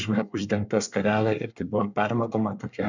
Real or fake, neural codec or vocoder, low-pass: fake; codec, 24 kHz, 1 kbps, SNAC; 7.2 kHz